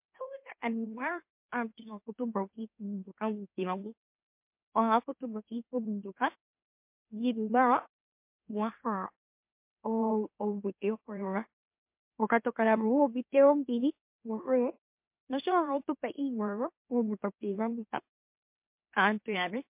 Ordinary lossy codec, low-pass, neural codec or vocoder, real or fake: MP3, 32 kbps; 3.6 kHz; autoencoder, 44.1 kHz, a latent of 192 numbers a frame, MeloTTS; fake